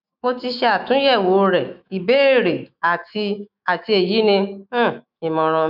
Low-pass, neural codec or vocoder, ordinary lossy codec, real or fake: 5.4 kHz; autoencoder, 48 kHz, 128 numbers a frame, DAC-VAE, trained on Japanese speech; none; fake